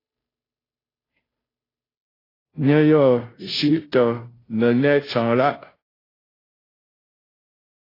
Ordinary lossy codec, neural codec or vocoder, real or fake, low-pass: AAC, 24 kbps; codec, 16 kHz, 0.5 kbps, FunCodec, trained on Chinese and English, 25 frames a second; fake; 5.4 kHz